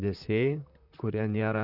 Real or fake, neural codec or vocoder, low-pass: fake; vocoder, 44.1 kHz, 128 mel bands, Pupu-Vocoder; 5.4 kHz